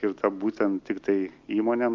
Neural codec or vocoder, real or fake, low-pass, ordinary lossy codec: none; real; 7.2 kHz; Opus, 32 kbps